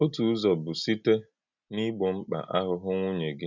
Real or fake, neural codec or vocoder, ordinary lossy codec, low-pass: real; none; none; 7.2 kHz